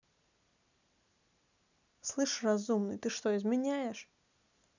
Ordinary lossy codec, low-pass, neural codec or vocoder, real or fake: none; 7.2 kHz; none; real